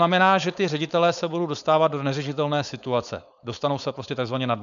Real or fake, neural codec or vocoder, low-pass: fake; codec, 16 kHz, 4.8 kbps, FACodec; 7.2 kHz